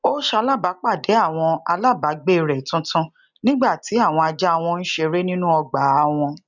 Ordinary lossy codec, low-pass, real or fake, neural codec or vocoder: none; 7.2 kHz; real; none